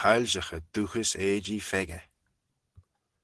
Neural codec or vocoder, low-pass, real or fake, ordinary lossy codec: none; 10.8 kHz; real; Opus, 16 kbps